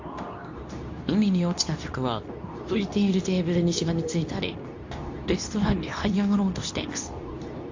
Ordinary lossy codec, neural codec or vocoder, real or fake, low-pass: AAC, 48 kbps; codec, 24 kHz, 0.9 kbps, WavTokenizer, medium speech release version 2; fake; 7.2 kHz